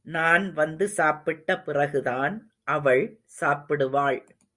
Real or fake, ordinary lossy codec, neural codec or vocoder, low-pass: real; Opus, 64 kbps; none; 10.8 kHz